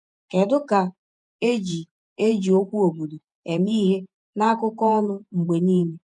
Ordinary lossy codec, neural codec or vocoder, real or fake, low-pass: none; vocoder, 48 kHz, 128 mel bands, Vocos; fake; 10.8 kHz